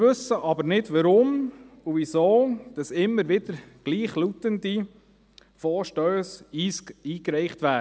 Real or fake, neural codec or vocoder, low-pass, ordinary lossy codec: real; none; none; none